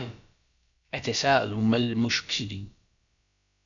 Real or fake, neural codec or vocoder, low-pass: fake; codec, 16 kHz, about 1 kbps, DyCAST, with the encoder's durations; 7.2 kHz